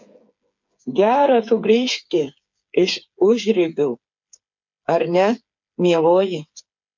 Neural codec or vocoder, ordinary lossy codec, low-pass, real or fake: codec, 16 kHz, 8 kbps, FreqCodec, smaller model; MP3, 48 kbps; 7.2 kHz; fake